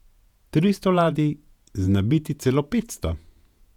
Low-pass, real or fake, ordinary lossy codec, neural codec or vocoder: 19.8 kHz; fake; none; vocoder, 48 kHz, 128 mel bands, Vocos